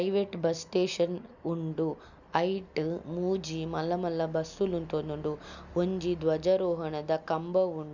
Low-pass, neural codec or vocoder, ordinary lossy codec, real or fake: 7.2 kHz; none; none; real